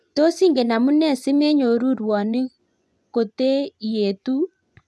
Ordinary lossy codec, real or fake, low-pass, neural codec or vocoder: none; fake; none; vocoder, 24 kHz, 100 mel bands, Vocos